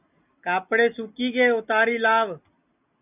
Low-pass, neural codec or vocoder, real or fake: 3.6 kHz; none; real